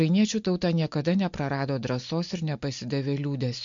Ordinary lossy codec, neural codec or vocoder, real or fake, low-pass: MP3, 48 kbps; none; real; 7.2 kHz